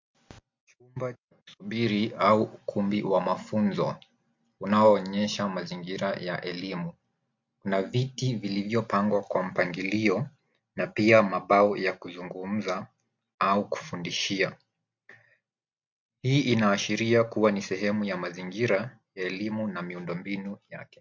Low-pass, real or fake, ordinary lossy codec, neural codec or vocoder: 7.2 kHz; real; MP3, 48 kbps; none